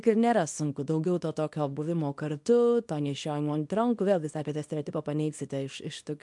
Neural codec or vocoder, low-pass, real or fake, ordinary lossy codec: codec, 24 kHz, 0.9 kbps, WavTokenizer, small release; 10.8 kHz; fake; MP3, 64 kbps